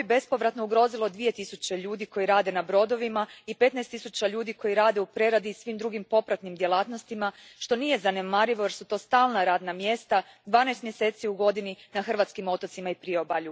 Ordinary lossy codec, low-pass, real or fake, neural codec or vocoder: none; none; real; none